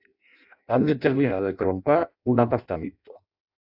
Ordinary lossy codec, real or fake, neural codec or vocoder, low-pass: AAC, 48 kbps; fake; codec, 16 kHz in and 24 kHz out, 0.6 kbps, FireRedTTS-2 codec; 5.4 kHz